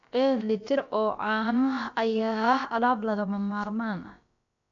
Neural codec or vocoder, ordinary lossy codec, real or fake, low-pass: codec, 16 kHz, about 1 kbps, DyCAST, with the encoder's durations; none; fake; 7.2 kHz